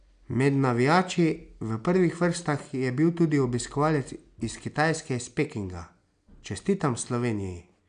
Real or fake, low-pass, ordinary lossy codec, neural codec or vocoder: real; 9.9 kHz; none; none